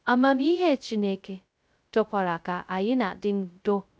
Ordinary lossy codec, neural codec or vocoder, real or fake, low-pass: none; codec, 16 kHz, 0.2 kbps, FocalCodec; fake; none